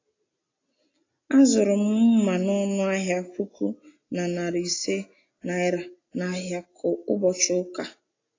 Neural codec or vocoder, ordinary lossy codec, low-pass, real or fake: none; AAC, 32 kbps; 7.2 kHz; real